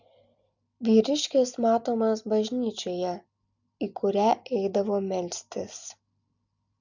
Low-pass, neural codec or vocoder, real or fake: 7.2 kHz; none; real